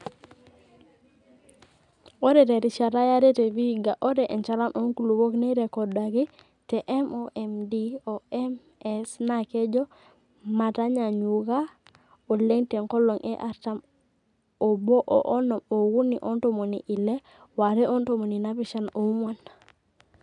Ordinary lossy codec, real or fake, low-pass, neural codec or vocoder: none; real; 10.8 kHz; none